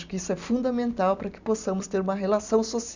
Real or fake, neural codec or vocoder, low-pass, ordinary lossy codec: real; none; 7.2 kHz; Opus, 64 kbps